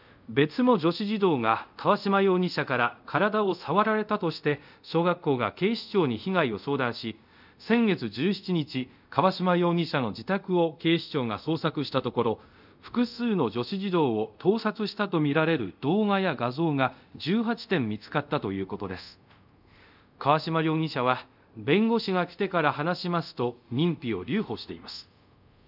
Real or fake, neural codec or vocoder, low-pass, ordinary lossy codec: fake; codec, 24 kHz, 0.5 kbps, DualCodec; 5.4 kHz; none